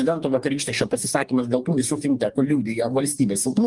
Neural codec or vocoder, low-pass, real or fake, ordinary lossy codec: codec, 44.1 kHz, 2.6 kbps, DAC; 10.8 kHz; fake; Opus, 32 kbps